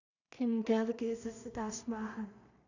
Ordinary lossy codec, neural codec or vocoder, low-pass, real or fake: none; codec, 16 kHz in and 24 kHz out, 0.4 kbps, LongCat-Audio-Codec, two codebook decoder; 7.2 kHz; fake